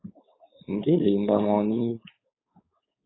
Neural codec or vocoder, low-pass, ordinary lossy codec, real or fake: codec, 16 kHz, 4.8 kbps, FACodec; 7.2 kHz; AAC, 16 kbps; fake